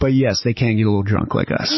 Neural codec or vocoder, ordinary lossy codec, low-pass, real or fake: none; MP3, 24 kbps; 7.2 kHz; real